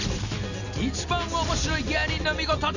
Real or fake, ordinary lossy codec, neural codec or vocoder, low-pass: real; none; none; 7.2 kHz